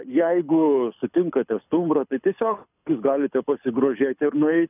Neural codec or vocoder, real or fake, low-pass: codec, 24 kHz, 6 kbps, HILCodec; fake; 3.6 kHz